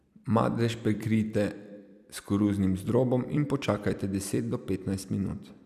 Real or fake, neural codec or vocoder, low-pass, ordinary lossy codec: fake; vocoder, 44.1 kHz, 128 mel bands every 256 samples, BigVGAN v2; 14.4 kHz; none